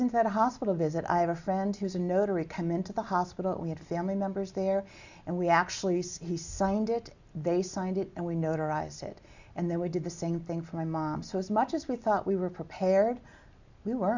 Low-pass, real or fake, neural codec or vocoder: 7.2 kHz; real; none